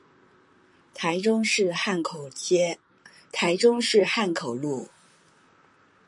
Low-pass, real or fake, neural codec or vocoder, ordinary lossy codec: 10.8 kHz; fake; vocoder, 44.1 kHz, 128 mel bands, Pupu-Vocoder; MP3, 64 kbps